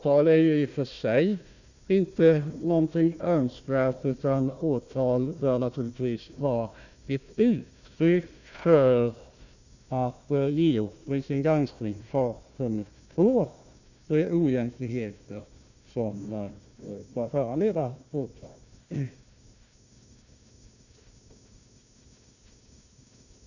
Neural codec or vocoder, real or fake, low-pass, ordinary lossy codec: codec, 16 kHz, 1 kbps, FunCodec, trained on Chinese and English, 50 frames a second; fake; 7.2 kHz; none